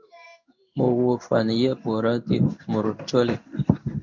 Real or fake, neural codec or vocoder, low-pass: fake; codec, 16 kHz in and 24 kHz out, 1 kbps, XY-Tokenizer; 7.2 kHz